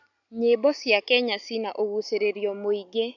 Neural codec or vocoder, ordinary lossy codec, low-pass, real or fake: none; none; 7.2 kHz; real